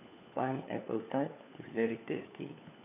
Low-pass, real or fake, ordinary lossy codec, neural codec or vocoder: 3.6 kHz; fake; none; codec, 16 kHz, 4 kbps, FunCodec, trained on LibriTTS, 50 frames a second